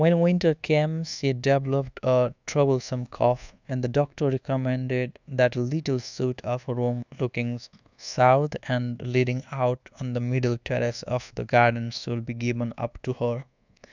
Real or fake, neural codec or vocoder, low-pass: fake; codec, 24 kHz, 1.2 kbps, DualCodec; 7.2 kHz